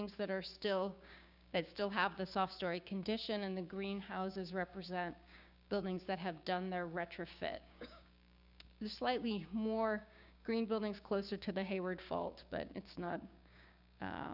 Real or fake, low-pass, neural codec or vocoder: fake; 5.4 kHz; codec, 16 kHz, 6 kbps, DAC